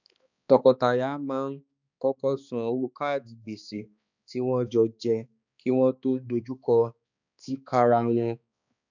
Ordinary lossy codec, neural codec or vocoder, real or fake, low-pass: none; codec, 16 kHz, 2 kbps, X-Codec, HuBERT features, trained on balanced general audio; fake; 7.2 kHz